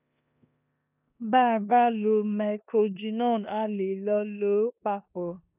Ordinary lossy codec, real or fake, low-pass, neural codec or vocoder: none; fake; 3.6 kHz; codec, 16 kHz in and 24 kHz out, 0.9 kbps, LongCat-Audio-Codec, four codebook decoder